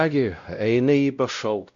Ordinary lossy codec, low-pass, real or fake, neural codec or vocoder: MP3, 96 kbps; 7.2 kHz; fake; codec, 16 kHz, 0.5 kbps, X-Codec, WavLM features, trained on Multilingual LibriSpeech